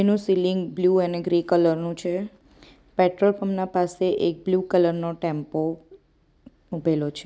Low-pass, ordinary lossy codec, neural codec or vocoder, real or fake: none; none; none; real